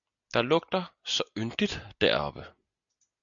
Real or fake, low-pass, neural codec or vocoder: real; 7.2 kHz; none